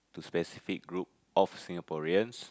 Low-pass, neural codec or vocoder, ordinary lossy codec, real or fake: none; none; none; real